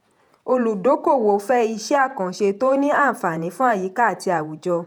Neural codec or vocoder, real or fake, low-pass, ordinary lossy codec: vocoder, 48 kHz, 128 mel bands, Vocos; fake; 19.8 kHz; none